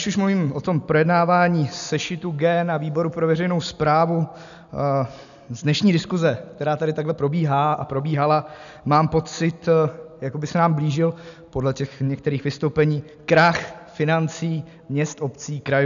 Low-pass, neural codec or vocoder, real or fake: 7.2 kHz; none; real